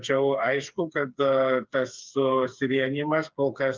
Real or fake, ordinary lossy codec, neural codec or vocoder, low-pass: fake; Opus, 24 kbps; codec, 16 kHz, 4 kbps, FreqCodec, smaller model; 7.2 kHz